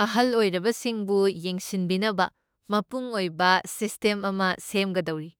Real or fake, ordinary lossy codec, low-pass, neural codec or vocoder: fake; none; none; autoencoder, 48 kHz, 32 numbers a frame, DAC-VAE, trained on Japanese speech